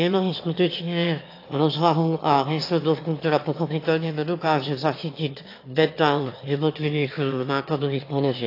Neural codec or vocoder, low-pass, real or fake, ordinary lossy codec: autoencoder, 22.05 kHz, a latent of 192 numbers a frame, VITS, trained on one speaker; 5.4 kHz; fake; AAC, 32 kbps